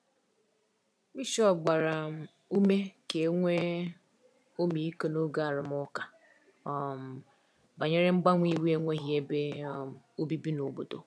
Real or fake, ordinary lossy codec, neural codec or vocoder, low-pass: fake; none; vocoder, 22.05 kHz, 80 mel bands, Vocos; none